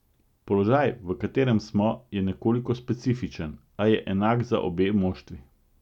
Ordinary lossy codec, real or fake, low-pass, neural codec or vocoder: none; real; 19.8 kHz; none